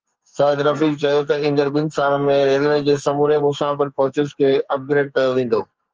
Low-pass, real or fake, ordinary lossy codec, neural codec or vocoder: 7.2 kHz; fake; Opus, 24 kbps; codec, 44.1 kHz, 2.6 kbps, SNAC